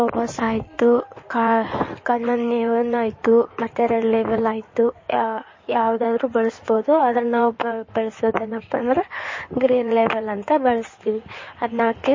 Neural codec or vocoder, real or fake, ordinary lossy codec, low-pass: codec, 16 kHz in and 24 kHz out, 2.2 kbps, FireRedTTS-2 codec; fake; MP3, 32 kbps; 7.2 kHz